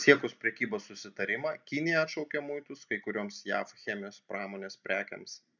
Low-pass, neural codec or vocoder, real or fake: 7.2 kHz; none; real